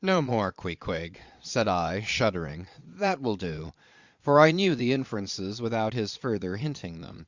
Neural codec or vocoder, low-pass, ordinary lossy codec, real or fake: vocoder, 22.05 kHz, 80 mel bands, Vocos; 7.2 kHz; Opus, 64 kbps; fake